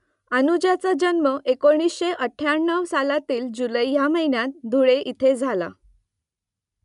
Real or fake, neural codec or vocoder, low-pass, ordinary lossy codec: real; none; 10.8 kHz; none